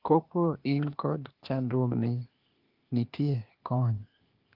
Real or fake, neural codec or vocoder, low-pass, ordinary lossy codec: fake; codec, 16 kHz, 0.8 kbps, ZipCodec; 5.4 kHz; Opus, 32 kbps